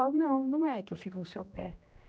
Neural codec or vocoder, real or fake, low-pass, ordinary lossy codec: codec, 16 kHz, 1 kbps, X-Codec, HuBERT features, trained on general audio; fake; none; none